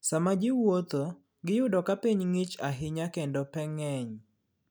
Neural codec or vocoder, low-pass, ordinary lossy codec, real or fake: none; none; none; real